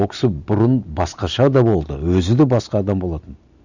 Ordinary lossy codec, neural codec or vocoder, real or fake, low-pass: none; none; real; 7.2 kHz